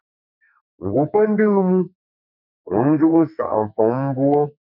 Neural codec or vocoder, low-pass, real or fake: codec, 44.1 kHz, 2.6 kbps, SNAC; 5.4 kHz; fake